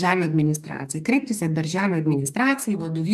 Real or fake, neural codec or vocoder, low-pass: fake; codec, 44.1 kHz, 2.6 kbps, DAC; 14.4 kHz